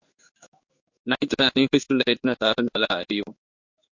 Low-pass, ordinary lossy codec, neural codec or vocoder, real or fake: 7.2 kHz; MP3, 48 kbps; codec, 16 kHz in and 24 kHz out, 1 kbps, XY-Tokenizer; fake